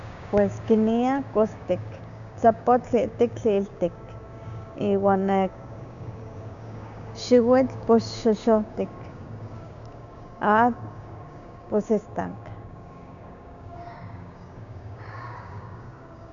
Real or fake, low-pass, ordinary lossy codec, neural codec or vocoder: fake; 7.2 kHz; none; codec, 16 kHz, 6 kbps, DAC